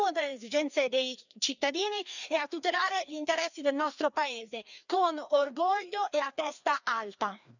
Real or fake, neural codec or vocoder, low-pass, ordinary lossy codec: fake; codec, 16 kHz, 2 kbps, FreqCodec, larger model; 7.2 kHz; none